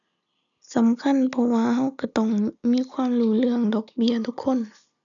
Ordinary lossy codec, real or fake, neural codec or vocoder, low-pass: none; real; none; 7.2 kHz